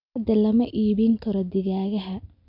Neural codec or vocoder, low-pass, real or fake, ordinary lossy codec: none; 5.4 kHz; real; none